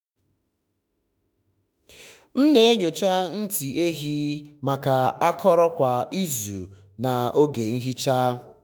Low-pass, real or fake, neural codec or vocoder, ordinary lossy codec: none; fake; autoencoder, 48 kHz, 32 numbers a frame, DAC-VAE, trained on Japanese speech; none